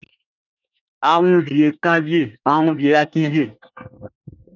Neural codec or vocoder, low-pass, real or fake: codec, 24 kHz, 1 kbps, SNAC; 7.2 kHz; fake